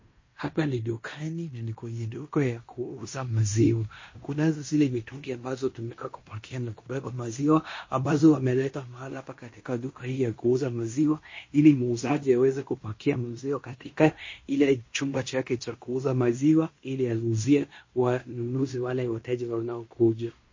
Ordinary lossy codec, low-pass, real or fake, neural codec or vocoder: MP3, 32 kbps; 7.2 kHz; fake; codec, 16 kHz in and 24 kHz out, 0.9 kbps, LongCat-Audio-Codec, fine tuned four codebook decoder